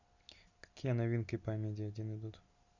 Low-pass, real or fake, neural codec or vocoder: 7.2 kHz; real; none